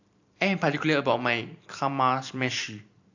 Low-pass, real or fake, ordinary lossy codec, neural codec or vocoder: 7.2 kHz; fake; AAC, 48 kbps; vocoder, 44.1 kHz, 128 mel bands every 512 samples, BigVGAN v2